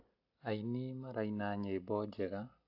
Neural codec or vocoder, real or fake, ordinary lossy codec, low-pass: none; real; AAC, 48 kbps; 5.4 kHz